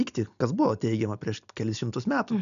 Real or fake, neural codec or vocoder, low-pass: real; none; 7.2 kHz